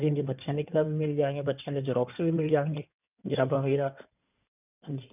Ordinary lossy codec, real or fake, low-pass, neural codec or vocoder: none; fake; 3.6 kHz; codec, 16 kHz in and 24 kHz out, 2.2 kbps, FireRedTTS-2 codec